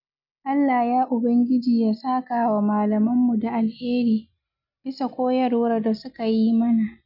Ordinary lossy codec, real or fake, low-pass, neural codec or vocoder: none; real; 5.4 kHz; none